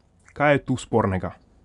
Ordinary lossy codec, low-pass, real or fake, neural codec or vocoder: none; 10.8 kHz; real; none